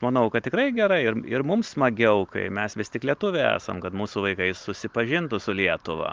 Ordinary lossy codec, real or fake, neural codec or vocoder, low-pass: Opus, 32 kbps; real; none; 7.2 kHz